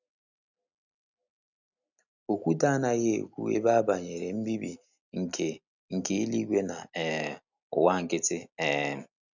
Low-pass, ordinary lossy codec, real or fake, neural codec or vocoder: 7.2 kHz; none; real; none